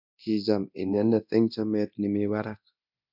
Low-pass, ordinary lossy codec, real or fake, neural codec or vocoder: 5.4 kHz; none; fake; codec, 24 kHz, 0.9 kbps, DualCodec